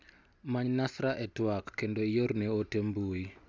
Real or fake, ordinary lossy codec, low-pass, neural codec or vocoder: real; none; none; none